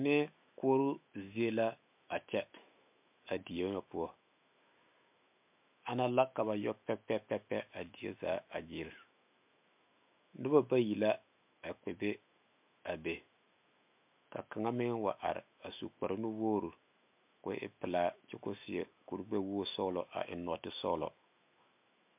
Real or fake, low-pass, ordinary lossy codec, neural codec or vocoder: fake; 3.6 kHz; MP3, 32 kbps; autoencoder, 48 kHz, 128 numbers a frame, DAC-VAE, trained on Japanese speech